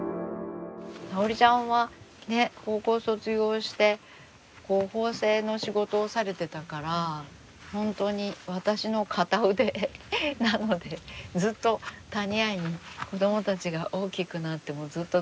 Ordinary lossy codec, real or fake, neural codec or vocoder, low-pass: none; real; none; none